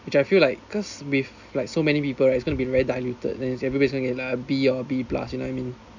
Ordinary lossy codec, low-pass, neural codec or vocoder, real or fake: none; 7.2 kHz; none; real